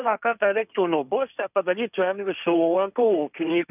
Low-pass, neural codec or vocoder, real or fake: 3.6 kHz; codec, 16 kHz, 1.1 kbps, Voila-Tokenizer; fake